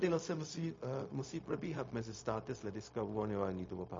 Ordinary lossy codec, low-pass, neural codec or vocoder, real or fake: MP3, 32 kbps; 7.2 kHz; codec, 16 kHz, 0.4 kbps, LongCat-Audio-Codec; fake